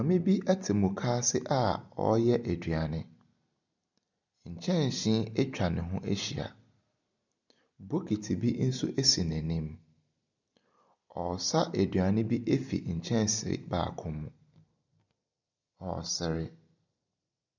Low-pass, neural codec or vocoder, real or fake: 7.2 kHz; none; real